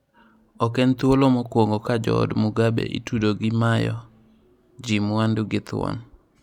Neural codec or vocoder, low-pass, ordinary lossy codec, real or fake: none; 19.8 kHz; none; real